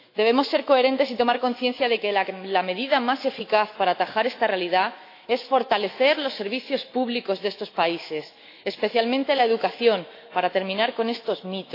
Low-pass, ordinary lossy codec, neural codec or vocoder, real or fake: 5.4 kHz; AAC, 32 kbps; autoencoder, 48 kHz, 128 numbers a frame, DAC-VAE, trained on Japanese speech; fake